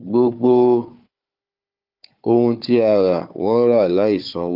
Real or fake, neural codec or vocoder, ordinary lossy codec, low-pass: fake; codec, 16 kHz, 4 kbps, FunCodec, trained on Chinese and English, 50 frames a second; Opus, 32 kbps; 5.4 kHz